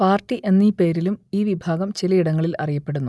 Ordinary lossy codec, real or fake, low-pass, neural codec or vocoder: none; real; none; none